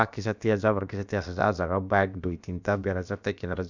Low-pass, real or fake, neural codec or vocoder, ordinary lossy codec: 7.2 kHz; fake; codec, 16 kHz, about 1 kbps, DyCAST, with the encoder's durations; none